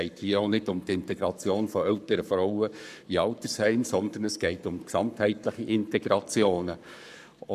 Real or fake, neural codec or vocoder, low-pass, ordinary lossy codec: fake; codec, 44.1 kHz, 7.8 kbps, Pupu-Codec; 14.4 kHz; none